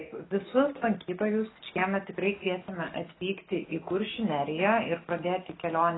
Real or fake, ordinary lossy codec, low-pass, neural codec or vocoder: real; AAC, 16 kbps; 7.2 kHz; none